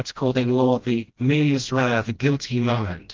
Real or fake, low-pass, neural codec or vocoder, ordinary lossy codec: fake; 7.2 kHz; codec, 16 kHz, 1 kbps, FreqCodec, smaller model; Opus, 32 kbps